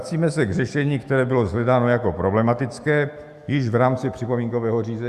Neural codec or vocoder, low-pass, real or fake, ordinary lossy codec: autoencoder, 48 kHz, 128 numbers a frame, DAC-VAE, trained on Japanese speech; 14.4 kHz; fake; Opus, 64 kbps